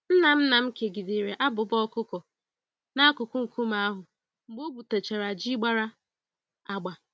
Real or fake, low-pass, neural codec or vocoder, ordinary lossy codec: real; none; none; none